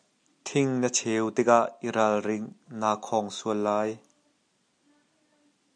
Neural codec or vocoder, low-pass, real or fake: none; 9.9 kHz; real